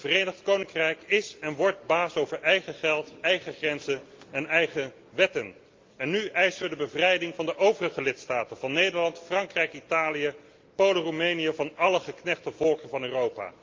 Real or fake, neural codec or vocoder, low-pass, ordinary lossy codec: real; none; 7.2 kHz; Opus, 24 kbps